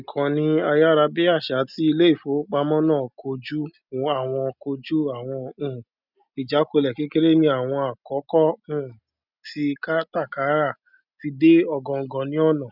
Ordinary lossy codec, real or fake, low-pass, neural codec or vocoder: none; real; 5.4 kHz; none